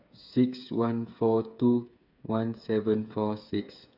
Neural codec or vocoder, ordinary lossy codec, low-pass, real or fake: codec, 16 kHz, 8 kbps, FreqCodec, smaller model; none; 5.4 kHz; fake